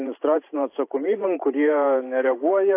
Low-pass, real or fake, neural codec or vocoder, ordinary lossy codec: 3.6 kHz; fake; vocoder, 44.1 kHz, 128 mel bands every 512 samples, BigVGAN v2; AAC, 24 kbps